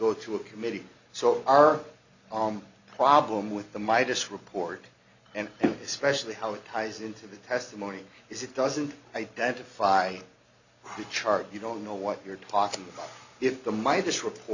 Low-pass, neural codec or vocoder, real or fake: 7.2 kHz; none; real